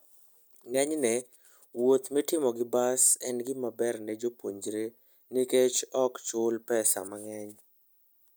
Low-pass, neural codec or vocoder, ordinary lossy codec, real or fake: none; none; none; real